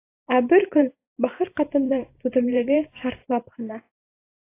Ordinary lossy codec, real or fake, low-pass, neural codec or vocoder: AAC, 24 kbps; fake; 3.6 kHz; vocoder, 44.1 kHz, 128 mel bands every 512 samples, BigVGAN v2